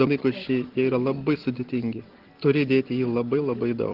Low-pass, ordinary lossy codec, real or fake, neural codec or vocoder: 5.4 kHz; Opus, 16 kbps; real; none